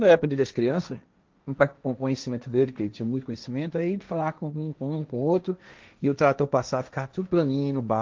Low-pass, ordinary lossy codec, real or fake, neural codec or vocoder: 7.2 kHz; Opus, 24 kbps; fake; codec, 16 kHz, 1.1 kbps, Voila-Tokenizer